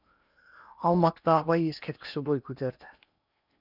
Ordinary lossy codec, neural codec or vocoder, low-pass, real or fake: none; codec, 16 kHz in and 24 kHz out, 0.8 kbps, FocalCodec, streaming, 65536 codes; 5.4 kHz; fake